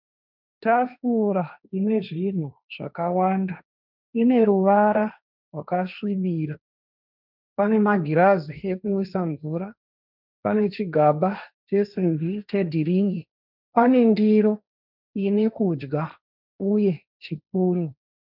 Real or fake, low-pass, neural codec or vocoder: fake; 5.4 kHz; codec, 16 kHz, 1.1 kbps, Voila-Tokenizer